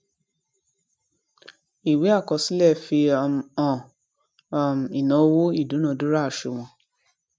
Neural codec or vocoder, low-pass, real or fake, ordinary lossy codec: none; none; real; none